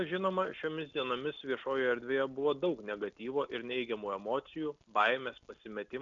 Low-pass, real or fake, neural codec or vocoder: 7.2 kHz; real; none